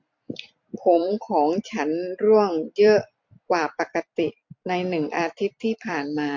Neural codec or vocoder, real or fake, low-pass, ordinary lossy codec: vocoder, 44.1 kHz, 128 mel bands every 256 samples, BigVGAN v2; fake; 7.2 kHz; MP3, 48 kbps